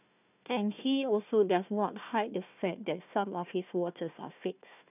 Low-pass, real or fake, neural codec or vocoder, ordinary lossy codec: 3.6 kHz; fake; codec, 16 kHz, 1 kbps, FunCodec, trained on Chinese and English, 50 frames a second; none